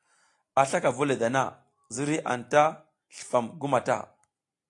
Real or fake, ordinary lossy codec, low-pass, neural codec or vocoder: real; AAC, 48 kbps; 10.8 kHz; none